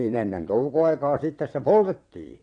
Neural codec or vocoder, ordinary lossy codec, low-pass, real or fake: vocoder, 22.05 kHz, 80 mel bands, WaveNeXt; AAC, 48 kbps; 9.9 kHz; fake